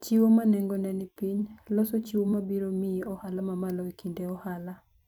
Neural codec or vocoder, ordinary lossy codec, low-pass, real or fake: vocoder, 44.1 kHz, 128 mel bands every 256 samples, BigVGAN v2; none; 19.8 kHz; fake